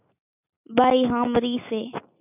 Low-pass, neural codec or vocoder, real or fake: 3.6 kHz; none; real